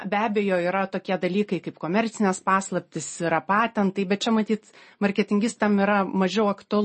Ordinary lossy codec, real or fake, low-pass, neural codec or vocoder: MP3, 32 kbps; real; 10.8 kHz; none